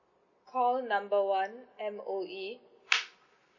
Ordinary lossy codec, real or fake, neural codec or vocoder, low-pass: MP3, 32 kbps; real; none; 7.2 kHz